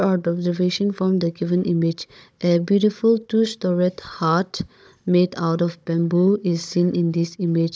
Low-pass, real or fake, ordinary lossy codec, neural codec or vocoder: none; fake; none; codec, 16 kHz, 16 kbps, FunCodec, trained on Chinese and English, 50 frames a second